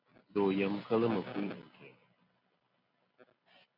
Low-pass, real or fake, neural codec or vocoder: 5.4 kHz; real; none